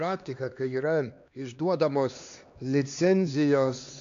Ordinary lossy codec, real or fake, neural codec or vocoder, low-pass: AAC, 64 kbps; fake; codec, 16 kHz, 2 kbps, X-Codec, HuBERT features, trained on LibriSpeech; 7.2 kHz